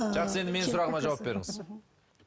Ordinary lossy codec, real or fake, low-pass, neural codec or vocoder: none; real; none; none